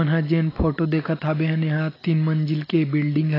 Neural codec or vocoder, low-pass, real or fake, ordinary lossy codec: none; 5.4 kHz; real; AAC, 24 kbps